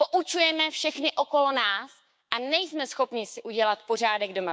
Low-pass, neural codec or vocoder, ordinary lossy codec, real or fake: none; codec, 16 kHz, 6 kbps, DAC; none; fake